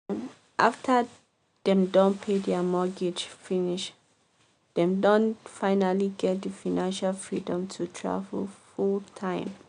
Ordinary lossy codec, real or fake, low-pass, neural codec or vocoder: none; real; 9.9 kHz; none